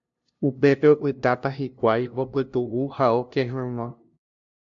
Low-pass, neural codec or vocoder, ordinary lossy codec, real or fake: 7.2 kHz; codec, 16 kHz, 0.5 kbps, FunCodec, trained on LibriTTS, 25 frames a second; AAC, 64 kbps; fake